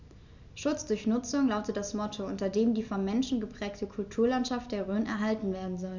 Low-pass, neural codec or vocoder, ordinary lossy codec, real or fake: 7.2 kHz; none; none; real